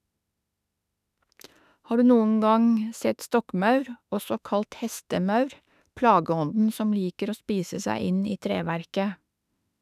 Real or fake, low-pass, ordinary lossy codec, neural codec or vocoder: fake; 14.4 kHz; none; autoencoder, 48 kHz, 32 numbers a frame, DAC-VAE, trained on Japanese speech